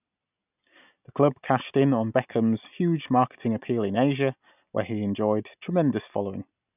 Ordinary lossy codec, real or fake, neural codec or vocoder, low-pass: none; real; none; 3.6 kHz